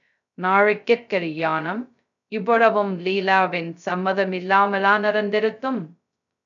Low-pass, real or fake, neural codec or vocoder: 7.2 kHz; fake; codec, 16 kHz, 0.2 kbps, FocalCodec